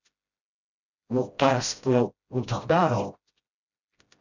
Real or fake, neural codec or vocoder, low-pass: fake; codec, 16 kHz, 0.5 kbps, FreqCodec, smaller model; 7.2 kHz